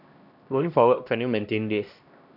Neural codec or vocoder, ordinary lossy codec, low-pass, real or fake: codec, 16 kHz, 1 kbps, X-Codec, HuBERT features, trained on LibriSpeech; none; 5.4 kHz; fake